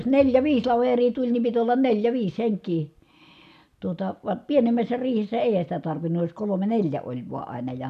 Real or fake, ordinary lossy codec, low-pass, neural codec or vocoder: real; none; 14.4 kHz; none